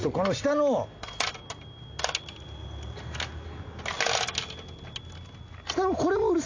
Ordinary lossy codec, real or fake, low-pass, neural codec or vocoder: AAC, 48 kbps; real; 7.2 kHz; none